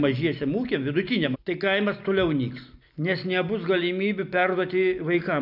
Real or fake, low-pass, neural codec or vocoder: real; 5.4 kHz; none